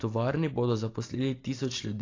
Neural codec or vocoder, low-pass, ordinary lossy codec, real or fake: none; 7.2 kHz; AAC, 32 kbps; real